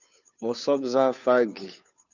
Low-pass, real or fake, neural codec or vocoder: 7.2 kHz; fake; codec, 16 kHz, 2 kbps, FunCodec, trained on Chinese and English, 25 frames a second